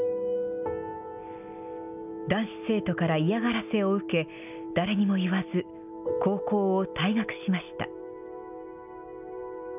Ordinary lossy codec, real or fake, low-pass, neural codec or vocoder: none; real; 3.6 kHz; none